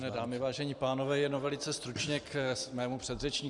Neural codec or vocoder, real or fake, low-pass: vocoder, 44.1 kHz, 128 mel bands every 512 samples, BigVGAN v2; fake; 10.8 kHz